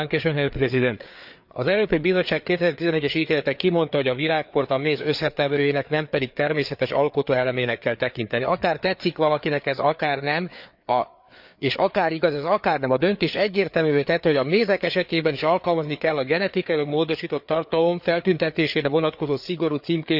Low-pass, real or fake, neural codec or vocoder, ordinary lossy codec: 5.4 kHz; fake; codec, 16 kHz, 4 kbps, FreqCodec, larger model; none